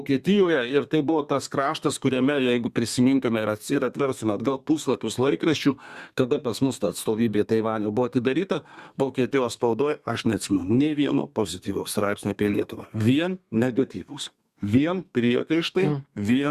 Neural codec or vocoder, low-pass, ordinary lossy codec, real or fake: codec, 32 kHz, 1.9 kbps, SNAC; 14.4 kHz; Opus, 64 kbps; fake